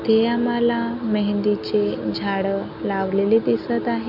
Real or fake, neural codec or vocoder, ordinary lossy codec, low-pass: real; none; none; 5.4 kHz